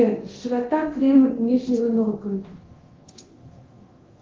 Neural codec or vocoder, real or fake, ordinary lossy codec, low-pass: codec, 24 kHz, 0.9 kbps, DualCodec; fake; Opus, 16 kbps; 7.2 kHz